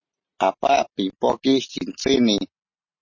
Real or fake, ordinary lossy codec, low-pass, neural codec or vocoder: real; MP3, 32 kbps; 7.2 kHz; none